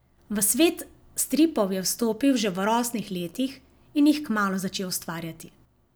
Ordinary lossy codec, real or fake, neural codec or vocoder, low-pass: none; real; none; none